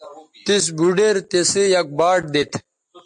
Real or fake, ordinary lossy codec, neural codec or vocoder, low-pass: real; MP3, 64 kbps; none; 10.8 kHz